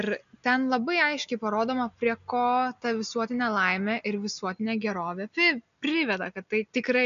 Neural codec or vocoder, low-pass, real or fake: none; 7.2 kHz; real